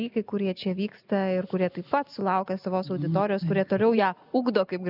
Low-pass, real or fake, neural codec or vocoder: 5.4 kHz; real; none